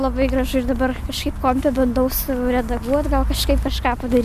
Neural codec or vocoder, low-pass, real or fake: none; 14.4 kHz; real